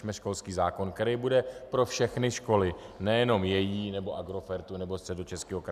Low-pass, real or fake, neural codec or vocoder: 14.4 kHz; real; none